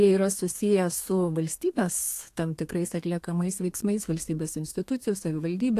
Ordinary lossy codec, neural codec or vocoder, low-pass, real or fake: AAC, 64 kbps; codec, 32 kHz, 1.9 kbps, SNAC; 14.4 kHz; fake